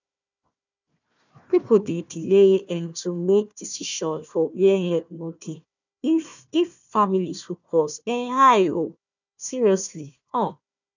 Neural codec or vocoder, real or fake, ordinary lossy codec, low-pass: codec, 16 kHz, 1 kbps, FunCodec, trained on Chinese and English, 50 frames a second; fake; none; 7.2 kHz